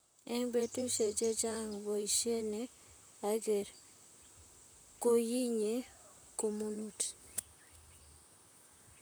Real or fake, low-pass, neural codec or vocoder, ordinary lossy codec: fake; none; vocoder, 44.1 kHz, 128 mel bands, Pupu-Vocoder; none